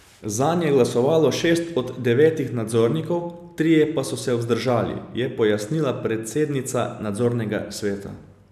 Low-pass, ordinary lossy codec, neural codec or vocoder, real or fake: 14.4 kHz; none; none; real